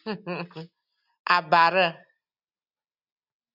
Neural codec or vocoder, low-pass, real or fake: none; 5.4 kHz; real